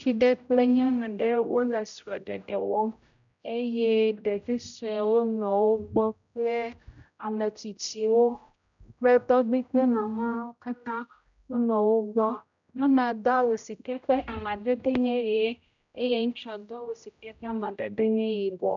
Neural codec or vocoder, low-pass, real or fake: codec, 16 kHz, 0.5 kbps, X-Codec, HuBERT features, trained on general audio; 7.2 kHz; fake